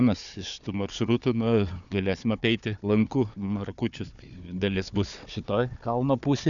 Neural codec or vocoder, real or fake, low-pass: codec, 16 kHz, 4 kbps, FunCodec, trained on Chinese and English, 50 frames a second; fake; 7.2 kHz